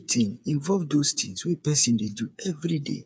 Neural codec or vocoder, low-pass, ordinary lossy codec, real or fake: codec, 16 kHz, 8 kbps, FreqCodec, smaller model; none; none; fake